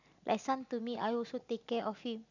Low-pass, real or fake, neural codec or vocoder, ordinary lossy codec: 7.2 kHz; real; none; none